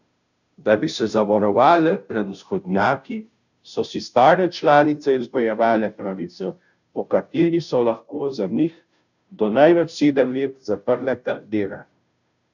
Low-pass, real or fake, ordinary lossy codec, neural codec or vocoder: 7.2 kHz; fake; none; codec, 16 kHz, 0.5 kbps, FunCodec, trained on Chinese and English, 25 frames a second